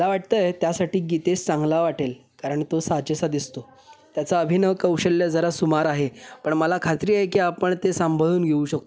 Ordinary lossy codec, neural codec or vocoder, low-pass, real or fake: none; none; none; real